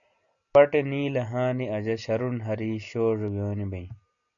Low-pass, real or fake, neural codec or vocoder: 7.2 kHz; real; none